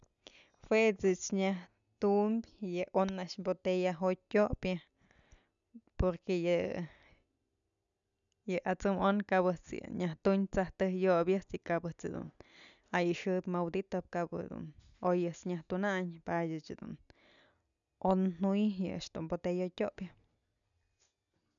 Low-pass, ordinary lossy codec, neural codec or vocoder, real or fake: 7.2 kHz; none; none; real